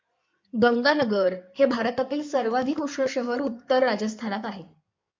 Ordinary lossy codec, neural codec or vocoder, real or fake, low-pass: MP3, 64 kbps; codec, 16 kHz in and 24 kHz out, 2.2 kbps, FireRedTTS-2 codec; fake; 7.2 kHz